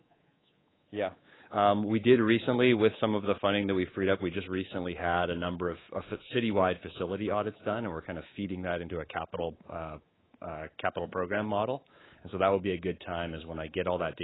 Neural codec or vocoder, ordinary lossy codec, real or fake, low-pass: codec, 24 kHz, 3.1 kbps, DualCodec; AAC, 16 kbps; fake; 7.2 kHz